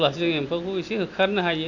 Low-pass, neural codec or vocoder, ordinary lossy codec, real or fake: 7.2 kHz; none; none; real